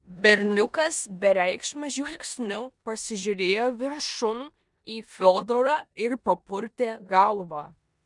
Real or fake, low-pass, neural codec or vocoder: fake; 10.8 kHz; codec, 16 kHz in and 24 kHz out, 0.9 kbps, LongCat-Audio-Codec, four codebook decoder